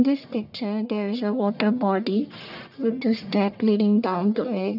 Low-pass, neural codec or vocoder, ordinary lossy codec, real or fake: 5.4 kHz; codec, 44.1 kHz, 1.7 kbps, Pupu-Codec; none; fake